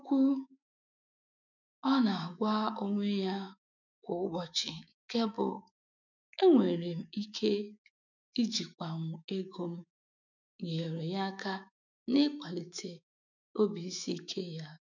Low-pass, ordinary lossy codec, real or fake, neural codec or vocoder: 7.2 kHz; none; fake; autoencoder, 48 kHz, 128 numbers a frame, DAC-VAE, trained on Japanese speech